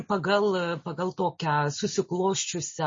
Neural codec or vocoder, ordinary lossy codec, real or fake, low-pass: none; MP3, 32 kbps; real; 7.2 kHz